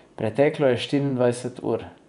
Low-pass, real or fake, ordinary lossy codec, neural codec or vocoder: 10.8 kHz; fake; none; vocoder, 24 kHz, 100 mel bands, Vocos